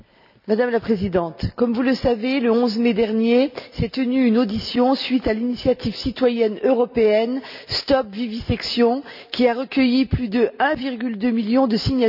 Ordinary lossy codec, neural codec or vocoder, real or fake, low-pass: none; none; real; 5.4 kHz